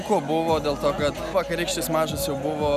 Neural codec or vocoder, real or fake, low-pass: none; real; 14.4 kHz